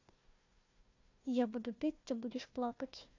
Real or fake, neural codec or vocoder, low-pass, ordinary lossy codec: fake; codec, 16 kHz, 1 kbps, FunCodec, trained on Chinese and English, 50 frames a second; 7.2 kHz; AAC, 48 kbps